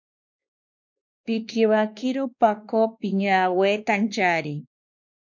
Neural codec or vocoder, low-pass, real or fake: codec, 16 kHz, 2 kbps, X-Codec, WavLM features, trained on Multilingual LibriSpeech; 7.2 kHz; fake